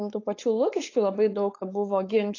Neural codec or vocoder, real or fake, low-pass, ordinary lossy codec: codec, 16 kHz, 4.8 kbps, FACodec; fake; 7.2 kHz; AAC, 32 kbps